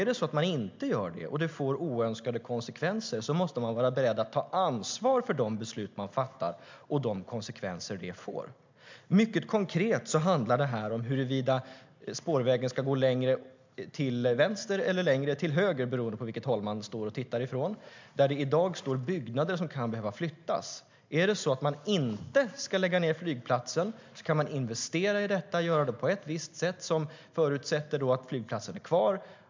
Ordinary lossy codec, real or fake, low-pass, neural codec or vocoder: MP3, 64 kbps; real; 7.2 kHz; none